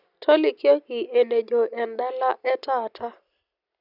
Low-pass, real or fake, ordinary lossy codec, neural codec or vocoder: 5.4 kHz; real; none; none